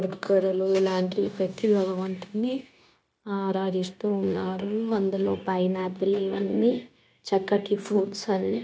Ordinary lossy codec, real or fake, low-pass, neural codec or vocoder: none; fake; none; codec, 16 kHz, 0.9 kbps, LongCat-Audio-Codec